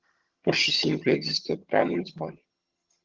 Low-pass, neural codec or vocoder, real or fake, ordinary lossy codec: 7.2 kHz; vocoder, 22.05 kHz, 80 mel bands, HiFi-GAN; fake; Opus, 16 kbps